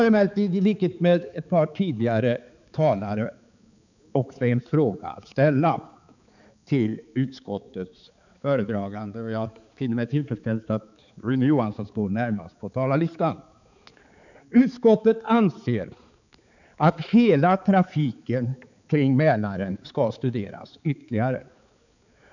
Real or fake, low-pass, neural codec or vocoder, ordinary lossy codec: fake; 7.2 kHz; codec, 16 kHz, 4 kbps, X-Codec, HuBERT features, trained on balanced general audio; none